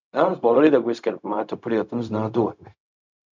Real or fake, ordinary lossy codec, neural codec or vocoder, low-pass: fake; MP3, 64 kbps; codec, 16 kHz, 0.4 kbps, LongCat-Audio-Codec; 7.2 kHz